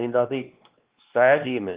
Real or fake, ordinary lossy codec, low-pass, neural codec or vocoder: fake; Opus, 32 kbps; 3.6 kHz; codec, 16 kHz, 2 kbps, X-Codec, WavLM features, trained on Multilingual LibriSpeech